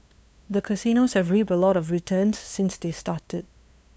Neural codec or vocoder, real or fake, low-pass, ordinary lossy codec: codec, 16 kHz, 2 kbps, FunCodec, trained on LibriTTS, 25 frames a second; fake; none; none